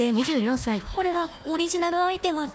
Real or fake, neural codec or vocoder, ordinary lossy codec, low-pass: fake; codec, 16 kHz, 1 kbps, FunCodec, trained on Chinese and English, 50 frames a second; none; none